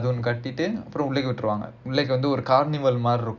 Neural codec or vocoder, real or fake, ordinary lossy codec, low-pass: none; real; Opus, 64 kbps; 7.2 kHz